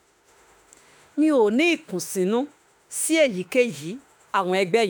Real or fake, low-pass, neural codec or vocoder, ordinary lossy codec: fake; none; autoencoder, 48 kHz, 32 numbers a frame, DAC-VAE, trained on Japanese speech; none